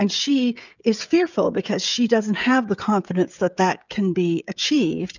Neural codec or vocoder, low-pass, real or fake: codec, 16 kHz, 16 kbps, FreqCodec, smaller model; 7.2 kHz; fake